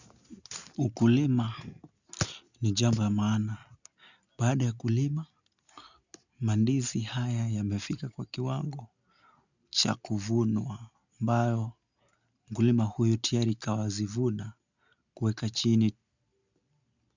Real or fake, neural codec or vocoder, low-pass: real; none; 7.2 kHz